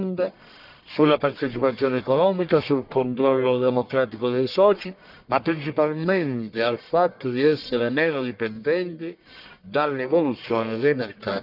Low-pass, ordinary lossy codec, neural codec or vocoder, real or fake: 5.4 kHz; none; codec, 44.1 kHz, 1.7 kbps, Pupu-Codec; fake